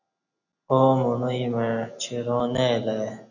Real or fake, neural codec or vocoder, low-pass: real; none; 7.2 kHz